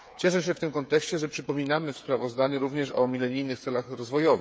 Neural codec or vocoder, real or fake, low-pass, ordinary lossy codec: codec, 16 kHz, 8 kbps, FreqCodec, smaller model; fake; none; none